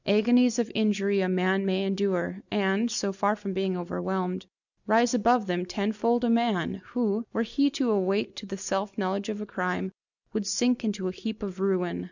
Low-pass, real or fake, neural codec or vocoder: 7.2 kHz; real; none